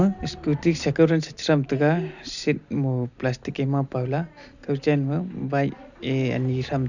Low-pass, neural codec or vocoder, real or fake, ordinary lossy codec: 7.2 kHz; none; real; none